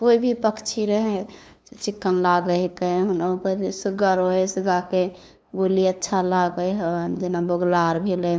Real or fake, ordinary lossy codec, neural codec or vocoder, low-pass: fake; none; codec, 16 kHz, 2 kbps, FunCodec, trained on LibriTTS, 25 frames a second; none